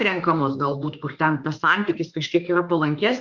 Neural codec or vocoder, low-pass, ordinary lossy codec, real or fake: autoencoder, 48 kHz, 32 numbers a frame, DAC-VAE, trained on Japanese speech; 7.2 kHz; Opus, 64 kbps; fake